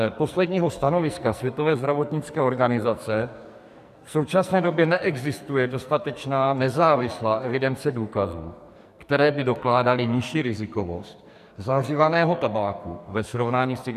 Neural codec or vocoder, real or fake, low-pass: codec, 44.1 kHz, 2.6 kbps, SNAC; fake; 14.4 kHz